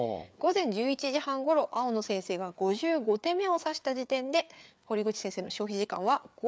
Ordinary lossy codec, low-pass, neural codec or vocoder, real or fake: none; none; codec, 16 kHz, 4 kbps, FreqCodec, larger model; fake